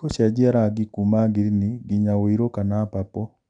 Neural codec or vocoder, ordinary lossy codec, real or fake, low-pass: none; none; real; 9.9 kHz